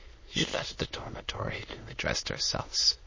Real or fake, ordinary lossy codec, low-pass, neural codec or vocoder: fake; MP3, 32 kbps; 7.2 kHz; autoencoder, 22.05 kHz, a latent of 192 numbers a frame, VITS, trained on many speakers